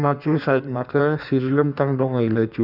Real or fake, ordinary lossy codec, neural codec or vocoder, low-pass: fake; none; codec, 16 kHz in and 24 kHz out, 1.1 kbps, FireRedTTS-2 codec; 5.4 kHz